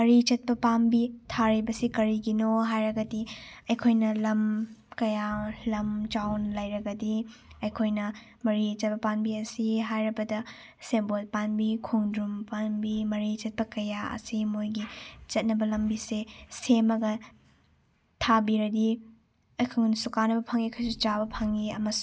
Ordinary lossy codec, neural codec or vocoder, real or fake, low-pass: none; none; real; none